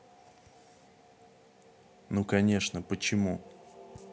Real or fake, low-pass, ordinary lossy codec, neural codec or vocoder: real; none; none; none